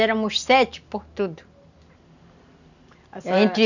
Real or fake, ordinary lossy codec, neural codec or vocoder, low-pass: real; none; none; 7.2 kHz